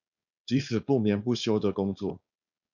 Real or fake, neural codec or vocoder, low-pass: fake; codec, 16 kHz, 4.8 kbps, FACodec; 7.2 kHz